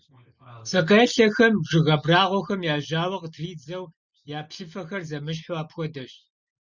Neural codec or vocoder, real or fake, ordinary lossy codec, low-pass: none; real; Opus, 64 kbps; 7.2 kHz